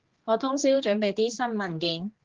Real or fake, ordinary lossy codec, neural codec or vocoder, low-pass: fake; Opus, 16 kbps; codec, 16 kHz, 2 kbps, X-Codec, HuBERT features, trained on general audio; 7.2 kHz